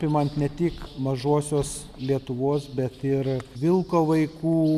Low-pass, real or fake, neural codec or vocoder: 14.4 kHz; real; none